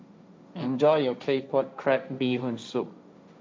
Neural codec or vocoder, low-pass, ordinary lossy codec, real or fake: codec, 16 kHz, 1.1 kbps, Voila-Tokenizer; none; none; fake